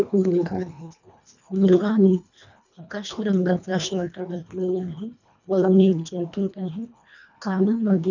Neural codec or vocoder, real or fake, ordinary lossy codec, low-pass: codec, 24 kHz, 1.5 kbps, HILCodec; fake; none; 7.2 kHz